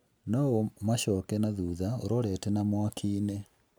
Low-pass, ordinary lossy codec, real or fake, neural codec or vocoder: none; none; real; none